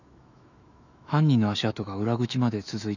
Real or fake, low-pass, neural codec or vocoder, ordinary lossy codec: fake; 7.2 kHz; autoencoder, 48 kHz, 128 numbers a frame, DAC-VAE, trained on Japanese speech; none